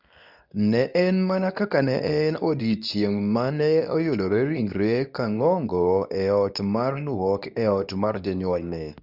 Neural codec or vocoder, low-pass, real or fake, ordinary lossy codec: codec, 24 kHz, 0.9 kbps, WavTokenizer, medium speech release version 2; 5.4 kHz; fake; none